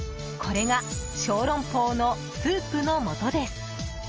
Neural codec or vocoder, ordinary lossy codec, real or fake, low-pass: none; Opus, 24 kbps; real; 7.2 kHz